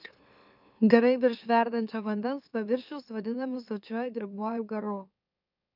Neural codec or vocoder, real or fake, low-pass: autoencoder, 44.1 kHz, a latent of 192 numbers a frame, MeloTTS; fake; 5.4 kHz